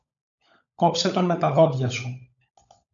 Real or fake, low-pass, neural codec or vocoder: fake; 7.2 kHz; codec, 16 kHz, 16 kbps, FunCodec, trained on LibriTTS, 50 frames a second